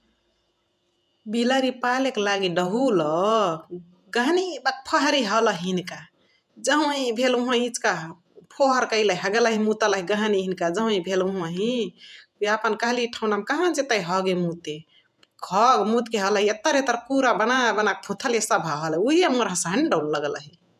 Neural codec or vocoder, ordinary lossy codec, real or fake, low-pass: vocoder, 48 kHz, 128 mel bands, Vocos; none; fake; 14.4 kHz